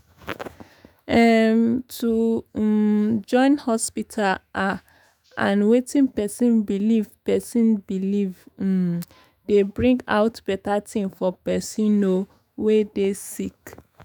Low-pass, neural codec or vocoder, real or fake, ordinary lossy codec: 19.8 kHz; autoencoder, 48 kHz, 128 numbers a frame, DAC-VAE, trained on Japanese speech; fake; none